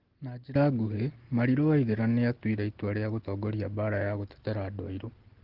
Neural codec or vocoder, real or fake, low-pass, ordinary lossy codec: none; real; 5.4 kHz; Opus, 16 kbps